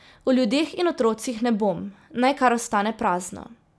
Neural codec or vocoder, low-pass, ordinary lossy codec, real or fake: none; none; none; real